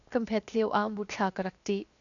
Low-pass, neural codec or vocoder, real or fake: 7.2 kHz; codec, 16 kHz, 0.7 kbps, FocalCodec; fake